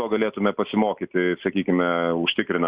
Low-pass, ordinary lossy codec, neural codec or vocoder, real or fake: 3.6 kHz; Opus, 64 kbps; none; real